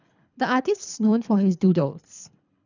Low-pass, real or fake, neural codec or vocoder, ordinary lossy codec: 7.2 kHz; fake; codec, 24 kHz, 3 kbps, HILCodec; none